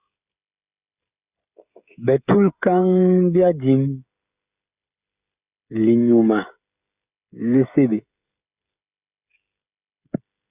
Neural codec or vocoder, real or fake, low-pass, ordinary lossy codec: codec, 16 kHz, 16 kbps, FreqCodec, smaller model; fake; 3.6 kHz; Opus, 64 kbps